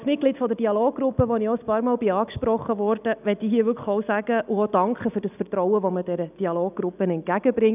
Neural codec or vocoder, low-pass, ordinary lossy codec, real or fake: none; 3.6 kHz; none; real